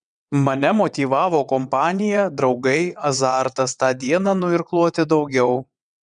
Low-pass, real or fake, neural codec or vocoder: 9.9 kHz; fake; vocoder, 22.05 kHz, 80 mel bands, WaveNeXt